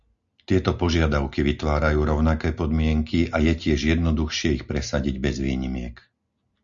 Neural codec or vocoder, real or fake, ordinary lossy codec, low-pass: none; real; Opus, 64 kbps; 7.2 kHz